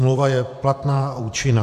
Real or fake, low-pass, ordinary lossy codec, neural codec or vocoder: fake; 14.4 kHz; AAC, 96 kbps; vocoder, 44.1 kHz, 128 mel bands every 512 samples, BigVGAN v2